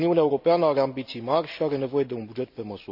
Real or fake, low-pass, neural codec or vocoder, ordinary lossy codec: real; 5.4 kHz; none; none